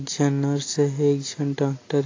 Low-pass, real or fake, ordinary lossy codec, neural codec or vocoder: 7.2 kHz; real; AAC, 32 kbps; none